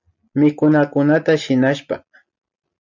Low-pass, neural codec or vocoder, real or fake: 7.2 kHz; none; real